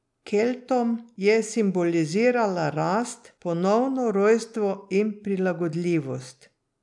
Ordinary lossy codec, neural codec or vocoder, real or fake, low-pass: none; none; real; 10.8 kHz